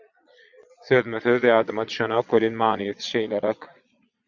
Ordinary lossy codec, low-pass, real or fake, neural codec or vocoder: Opus, 64 kbps; 7.2 kHz; fake; vocoder, 22.05 kHz, 80 mel bands, Vocos